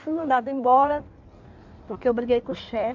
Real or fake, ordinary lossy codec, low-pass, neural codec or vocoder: fake; none; 7.2 kHz; codec, 16 kHz in and 24 kHz out, 1.1 kbps, FireRedTTS-2 codec